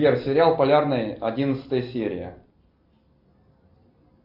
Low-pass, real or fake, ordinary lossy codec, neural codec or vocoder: 5.4 kHz; real; AAC, 48 kbps; none